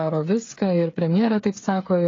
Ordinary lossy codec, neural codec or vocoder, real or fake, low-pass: AAC, 32 kbps; codec, 16 kHz, 8 kbps, FreqCodec, smaller model; fake; 7.2 kHz